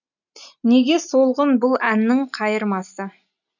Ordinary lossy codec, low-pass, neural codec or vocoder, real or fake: none; 7.2 kHz; none; real